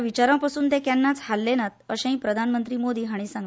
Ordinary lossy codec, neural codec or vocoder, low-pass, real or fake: none; none; none; real